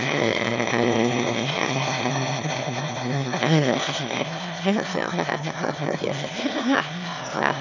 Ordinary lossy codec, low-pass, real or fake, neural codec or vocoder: none; 7.2 kHz; fake; autoencoder, 22.05 kHz, a latent of 192 numbers a frame, VITS, trained on one speaker